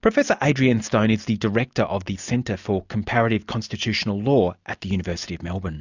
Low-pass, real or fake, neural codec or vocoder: 7.2 kHz; real; none